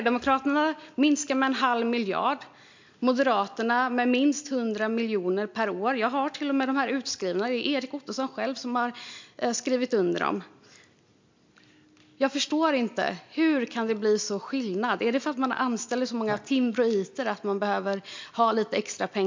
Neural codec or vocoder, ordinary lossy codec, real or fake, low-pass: none; MP3, 64 kbps; real; 7.2 kHz